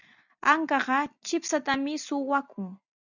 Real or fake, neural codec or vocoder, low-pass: real; none; 7.2 kHz